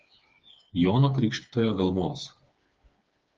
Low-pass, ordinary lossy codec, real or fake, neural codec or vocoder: 7.2 kHz; Opus, 24 kbps; fake; codec, 16 kHz, 4 kbps, FreqCodec, smaller model